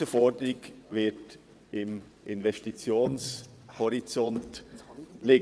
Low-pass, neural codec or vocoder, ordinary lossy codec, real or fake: none; vocoder, 22.05 kHz, 80 mel bands, WaveNeXt; none; fake